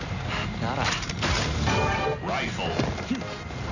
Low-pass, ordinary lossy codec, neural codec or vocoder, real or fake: 7.2 kHz; none; none; real